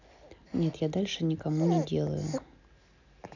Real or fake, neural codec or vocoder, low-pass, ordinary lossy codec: real; none; 7.2 kHz; none